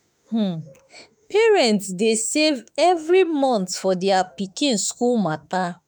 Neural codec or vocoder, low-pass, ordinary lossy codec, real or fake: autoencoder, 48 kHz, 32 numbers a frame, DAC-VAE, trained on Japanese speech; none; none; fake